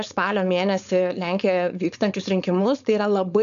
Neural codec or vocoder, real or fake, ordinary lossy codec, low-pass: codec, 16 kHz, 4.8 kbps, FACodec; fake; MP3, 96 kbps; 7.2 kHz